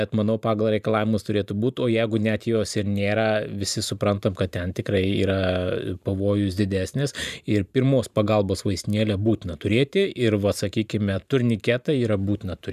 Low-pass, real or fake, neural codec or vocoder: 14.4 kHz; fake; vocoder, 44.1 kHz, 128 mel bands every 512 samples, BigVGAN v2